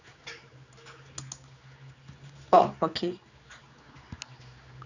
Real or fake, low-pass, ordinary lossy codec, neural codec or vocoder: fake; 7.2 kHz; none; codec, 16 kHz, 4 kbps, X-Codec, HuBERT features, trained on general audio